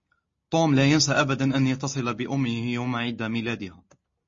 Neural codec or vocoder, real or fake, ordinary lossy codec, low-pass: none; real; MP3, 32 kbps; 7.2 kHz